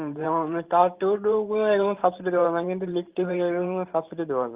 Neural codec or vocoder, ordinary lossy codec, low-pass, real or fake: vocoder, 44.1 kHz, 128 mel bands every 512 samples, BigVGAN v2; Opus, 32 kbps; 3.6 kHz; fake